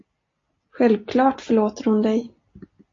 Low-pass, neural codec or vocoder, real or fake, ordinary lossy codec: 7.2 kHz; none; real; AAC, 32 kbps